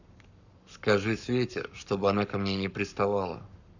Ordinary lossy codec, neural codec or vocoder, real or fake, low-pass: none; codec, 44.1 kHz, 7.8 kbps, Pupu-Codec; fake; 7.2 kHz